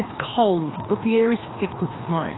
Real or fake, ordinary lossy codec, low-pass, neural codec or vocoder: fake; AAC, 16 kbps; 7.2 kHz; codec, 16 kHz, 2 kbps, FreqCodec, larger model